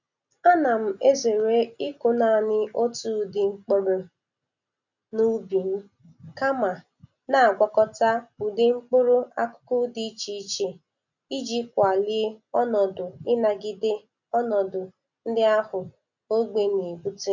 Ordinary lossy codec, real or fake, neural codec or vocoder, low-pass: none; real; none; 7.2 kHz